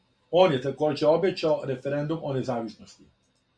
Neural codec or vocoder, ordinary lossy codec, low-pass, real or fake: none; Opus, 64 kbps; 9.9 kHz; real